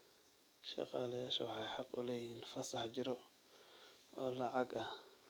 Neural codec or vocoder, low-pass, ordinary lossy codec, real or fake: codec, 44.1 kHz, 7.8 kbps, DAC; none; none; fake